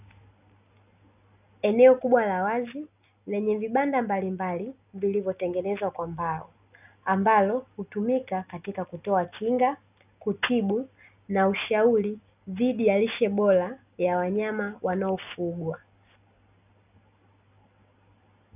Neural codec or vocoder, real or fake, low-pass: none; real; 3.6 kHz